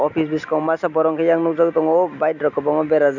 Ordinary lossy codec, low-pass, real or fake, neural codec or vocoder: none; 7.2 kHz; real; none